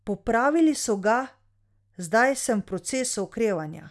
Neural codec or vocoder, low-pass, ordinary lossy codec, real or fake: none; none; none; real